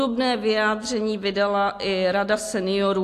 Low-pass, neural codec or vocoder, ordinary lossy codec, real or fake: 14.4 kHz; none; AAC, 64 kbps; real